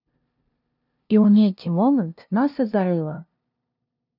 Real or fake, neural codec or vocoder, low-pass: fake; codec, 16 kHz, 0.5 kbps, FunCodec, trained on LibriTTS, 25 frames a second; 5.4 kHz